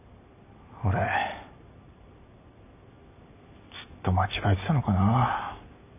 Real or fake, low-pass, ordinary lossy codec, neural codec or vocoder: real; 3.6 kHz; none; none